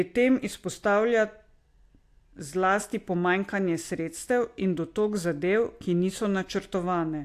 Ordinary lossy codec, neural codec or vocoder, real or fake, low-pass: AAC, 64 kbps; none; real; 14.4 kHz